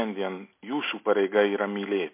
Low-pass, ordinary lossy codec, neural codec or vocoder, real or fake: 3.6 kHz; MP3, 24 kbps; none; real